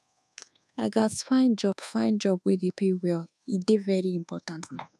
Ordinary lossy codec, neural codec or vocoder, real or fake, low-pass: none; codec, 24 kHz, 1.2 kbps, DualCodec; fake; none